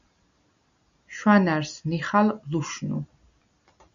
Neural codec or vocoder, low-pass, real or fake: none; 7.2 kHz; real